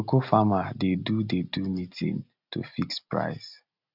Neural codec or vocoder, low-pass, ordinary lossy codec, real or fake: none; 5.4 kHz; none; real